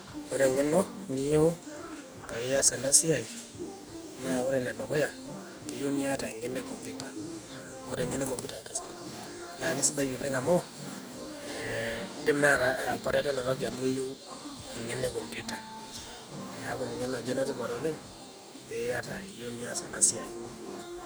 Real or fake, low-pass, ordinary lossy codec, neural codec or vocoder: fake; none; none; codec, 44.1 kHz, 2.6 kbps, DAC